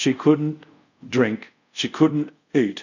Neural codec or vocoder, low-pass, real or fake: codec, 24 kHz, 0.5 kbps, DualCodec; 7.2 kHz; fake